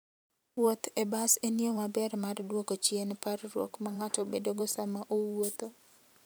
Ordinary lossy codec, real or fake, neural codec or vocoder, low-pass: none; fake; vocoder, 44.1 kHz, 128 mel bands every 512 samples, BigVGAN v2; none